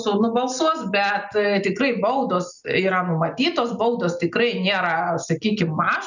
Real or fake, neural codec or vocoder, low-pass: real; none; 7.2 kHz